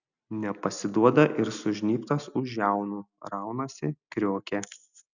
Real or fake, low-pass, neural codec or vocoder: real; 7.2 kHz; none